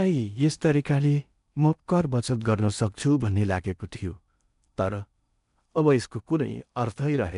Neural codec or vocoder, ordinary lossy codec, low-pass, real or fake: codec, 16 kHz in and 24 kHz out, 0.8 kbps, FocalCodec, streaming, 65536 codes; none; 10.8 kHz; fake